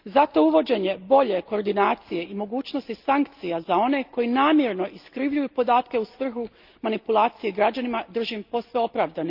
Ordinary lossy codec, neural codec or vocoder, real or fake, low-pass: Opus, 24 kbps; none; real; 5.4 kHz